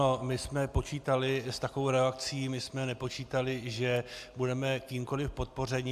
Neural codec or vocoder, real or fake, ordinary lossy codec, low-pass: none; real; AAC, 96 kbps; 14.4 kHz